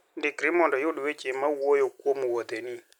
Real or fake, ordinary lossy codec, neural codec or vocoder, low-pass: real; none; none; 19.8 kHz